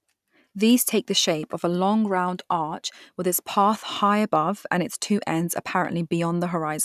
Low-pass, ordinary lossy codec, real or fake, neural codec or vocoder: 14.4 kHz; none; real; none